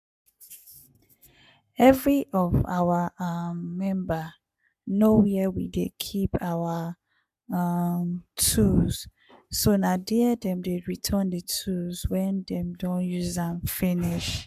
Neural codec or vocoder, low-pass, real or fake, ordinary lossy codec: codec, 44.1 kHz, 7.8 kbps, Pupu-Codec; 14.4 kHz; fake; none